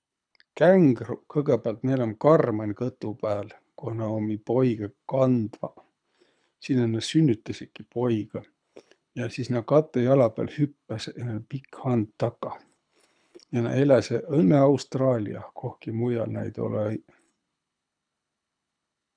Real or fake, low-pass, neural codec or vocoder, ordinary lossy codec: fake; 9.9 kHz; codec, 24 kHz, 6 kbps, HILCodec; none